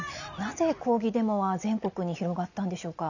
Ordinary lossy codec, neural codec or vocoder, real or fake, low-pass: none; none; real; 7.2 kHz